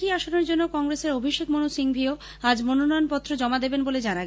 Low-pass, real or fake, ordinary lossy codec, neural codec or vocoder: none; real; none; none